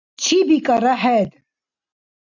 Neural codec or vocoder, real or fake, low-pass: none; real; 7.2 kHz